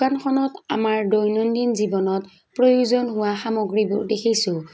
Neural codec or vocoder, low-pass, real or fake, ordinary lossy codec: none; none; real; none